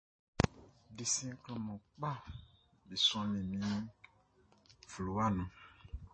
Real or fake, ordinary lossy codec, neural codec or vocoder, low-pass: real; MP3, 32 kbps; none; 9.9 kHz